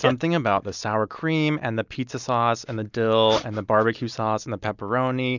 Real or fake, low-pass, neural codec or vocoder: real; 7.2 kHz; none